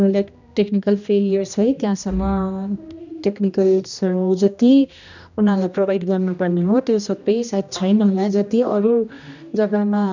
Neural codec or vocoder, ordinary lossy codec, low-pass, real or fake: codec, 16 kHz, 1 kbps, X-Codec, HuBERT features, trained on general audio; none; 7.2 kHz; fake